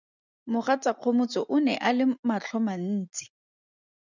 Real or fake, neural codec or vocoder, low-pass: real; none; 7.2 kHz